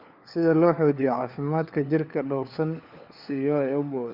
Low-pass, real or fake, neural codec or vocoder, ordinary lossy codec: 5.4 kHz; fake; codec, 16 kHz, 4 kbps, FunCodec, trained on LibriTTS, 50 frames a second; Opus, 64 kbps